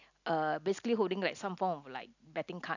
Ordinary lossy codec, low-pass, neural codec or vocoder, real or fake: none; 7.2 kHz; none; real